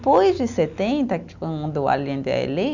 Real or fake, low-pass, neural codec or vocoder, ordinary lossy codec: real; 7.2 kHz; none; none